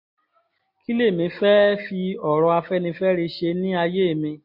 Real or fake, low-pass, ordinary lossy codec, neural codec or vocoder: real; 5.4 kHz; MP3, 32 kbps; none